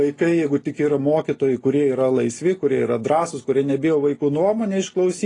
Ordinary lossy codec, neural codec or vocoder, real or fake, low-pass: AAC, 32 kbps; none; real; 10.8 kHz